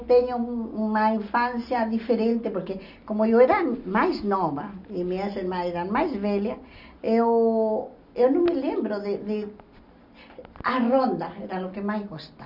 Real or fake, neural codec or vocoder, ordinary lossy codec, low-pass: real; none; none; 5.4 kHz